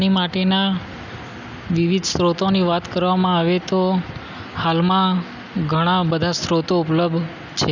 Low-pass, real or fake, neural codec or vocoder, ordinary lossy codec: 7.2 kHz; real; none; none